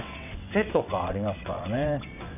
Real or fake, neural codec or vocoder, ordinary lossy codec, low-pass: fake; vocoder, 22.05 kHz, 80 mel bands, WaveNeXt; none; 3.6 kHz